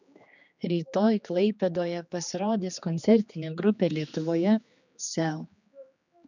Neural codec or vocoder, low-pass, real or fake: codec, 16 kHz, 2 kbps, X-Codec, HuBERT features, trained on general audio; 7.2 kHz; fake